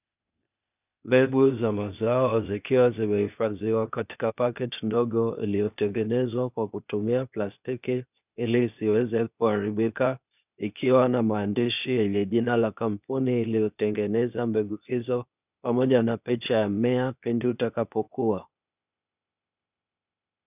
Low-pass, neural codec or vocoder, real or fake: 3.6 kHz; codec, 16 kHz, 0.8 kbps, ZipCodec; fake